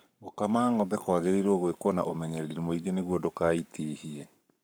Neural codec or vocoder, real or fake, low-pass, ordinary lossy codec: codec, 44.1 kHz, 7.8 kbps, Pupu-Codec; fake; none; none